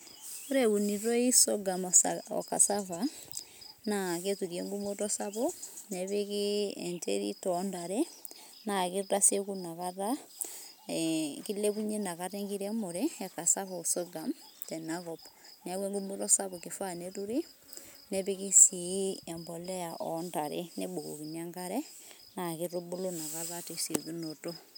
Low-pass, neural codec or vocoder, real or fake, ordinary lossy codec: none; none; real; none